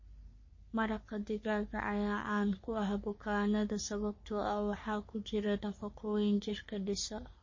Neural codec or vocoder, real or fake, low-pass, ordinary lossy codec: codec, 44.1 kHz, 3.4 kbps, Pupu-Codec; fake; 7.2 kHz; MP3, 32 kbps